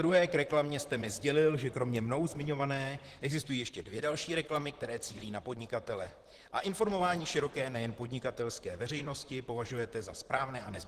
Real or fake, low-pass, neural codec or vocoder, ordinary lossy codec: fake; 14.4 kHz; vocoder, 44.1 kHz, 128 mel bands, Pupu-Vocoder; Opus, 16 kbps